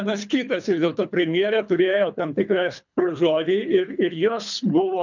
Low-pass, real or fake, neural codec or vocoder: 7.2 kHz; fake; codec, 24 kHz, 3 kbps, HILCodec